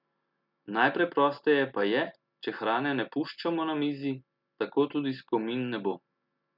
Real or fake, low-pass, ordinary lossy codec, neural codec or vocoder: real; 5.4 kHz; none; none